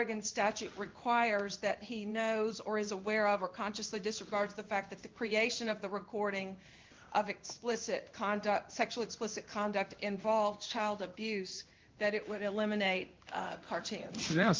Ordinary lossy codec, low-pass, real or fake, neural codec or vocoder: Opus, 32 kbps; 7.2 kHz; fake; codec, 16 kHz in and 24 kHz out, 1 kbps, XY-Tokenizer